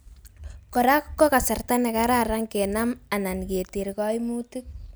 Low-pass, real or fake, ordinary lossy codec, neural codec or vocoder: none; real; none; none